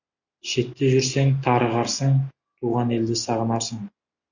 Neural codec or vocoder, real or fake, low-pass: none; real; 7.2 kHz